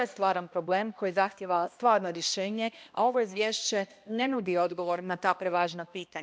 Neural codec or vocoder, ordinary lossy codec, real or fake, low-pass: codec, 16 kHz, 1 kbps, X-Codec, HuBERT features, trained on balanced general audio; none; fake; none